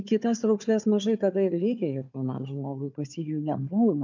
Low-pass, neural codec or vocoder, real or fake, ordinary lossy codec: 7.2 kHz; codec, 16 kHz, 4 kbps, FunCodec, trained on Chinese and English, 50 frames a second; fake; MP3, 64 kbps